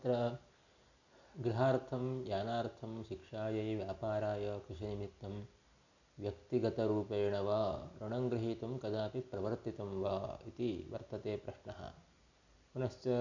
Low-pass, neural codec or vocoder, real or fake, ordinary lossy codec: 7.2 kHz; none; real; none